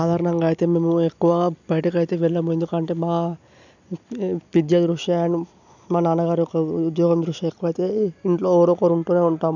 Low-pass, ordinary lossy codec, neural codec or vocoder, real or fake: 7.2 kHz; none; none; real